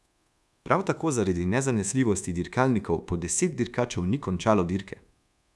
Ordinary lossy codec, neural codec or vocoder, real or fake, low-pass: none; codec, 24 kHz, 1.2 kbps, DualCodec; fake; none